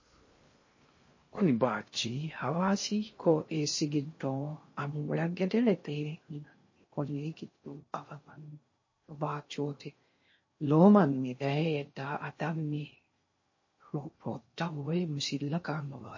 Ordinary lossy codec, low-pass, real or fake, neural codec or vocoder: MP3, 32 kbps; 7.2 kHz; fake; codec, 16 kHz in and 24 kHz out, 0.6 kbps, FocalCodec, streaming, 4096 codes